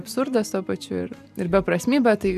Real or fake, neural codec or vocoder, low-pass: real; none; 14.4 kHz